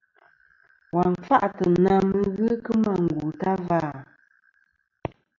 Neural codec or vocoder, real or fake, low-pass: none; real; 7.2 kHz